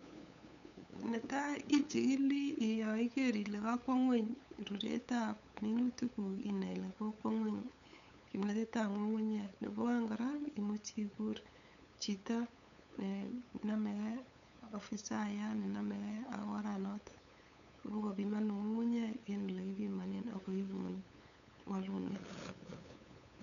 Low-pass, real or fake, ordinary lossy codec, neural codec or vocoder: 7.2 kHz; fake; none; codec, 16 kHz, 8 kbps, FunCodec, trained on LibriTTS, 25 frames a second